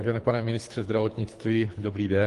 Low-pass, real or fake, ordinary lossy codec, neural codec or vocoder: 10.8 kHz; fake; Opus, 24 kbps; codec, 24 kHz, 3 kbps, HILCodec